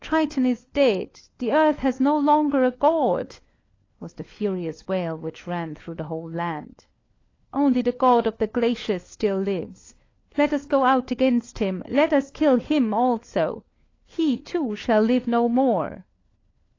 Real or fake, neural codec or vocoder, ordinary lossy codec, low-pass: fake; codec, 16 kHz, 4 kbps, FunCodec, trained on LibriTTS, 50 frames a second; AAC, 32 kbps; 7.2 kHz